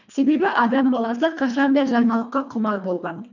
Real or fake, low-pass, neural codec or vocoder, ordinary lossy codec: fake; 7.2 kHz; codec, 24 kHz, 1.5 kbps, HILCodec; none